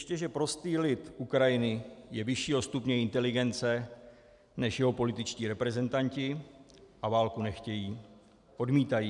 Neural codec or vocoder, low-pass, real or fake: none; 10.8 kHz; real